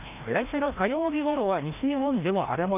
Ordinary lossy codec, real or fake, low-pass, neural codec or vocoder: none; fake; 3.6 kHz; codec, 16 kHz, 1 kbps, FreqCodec, larger model